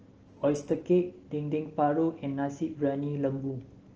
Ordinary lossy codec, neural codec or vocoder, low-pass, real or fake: Opus, 16 kbps; none; 7.2 kHz; real